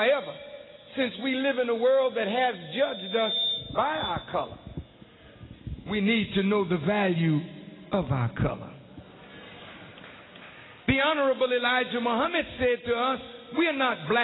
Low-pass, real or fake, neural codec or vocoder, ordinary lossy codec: 7.2 kHz; real; none; AAC, 16 kbps